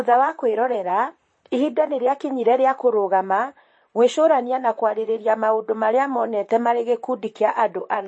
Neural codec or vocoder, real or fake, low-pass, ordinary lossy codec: vocoder, 44.1 kHz, 128 mel bands, Pupu-Vocoder; fake; 9.9 kHz; MP3, 32 kbps